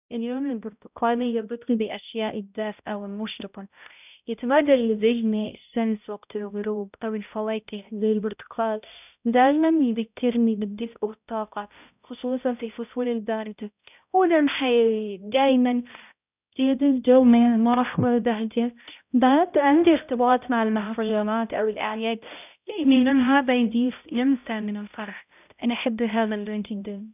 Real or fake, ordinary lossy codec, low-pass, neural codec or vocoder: fake; none; 3.6 kHz; codec, 16 kHz, 0.5 kbps, X-Codec, HuBERT features, trained on balanced general audio